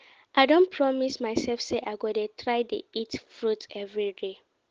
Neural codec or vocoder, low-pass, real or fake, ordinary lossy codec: none; 7.2 kHz; real; Opus, 16 kbps